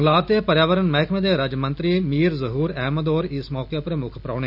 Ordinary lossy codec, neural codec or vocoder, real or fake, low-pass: none; none; real; 5.4 kHz